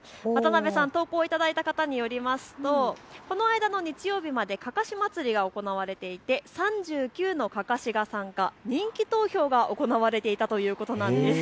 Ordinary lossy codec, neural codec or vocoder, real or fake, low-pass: none; none; real; none